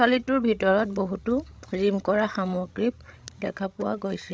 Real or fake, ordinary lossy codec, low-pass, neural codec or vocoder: fake; none; none; codec, 16 kHz, 16 kbps, FreqCodec, larger model